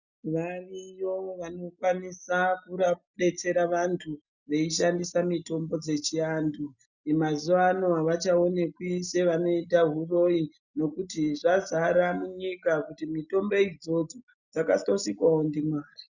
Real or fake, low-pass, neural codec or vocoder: real; 7.2 kHz; none